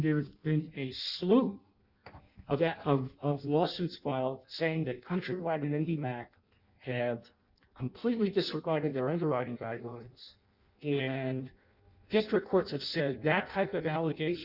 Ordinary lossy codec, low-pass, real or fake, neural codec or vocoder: Opus, 64 kbps; 5.4 kHz; fake; codec, 16 kHz in and 24 kHz out, 0.6 kbps, FireRedTTS-2 codec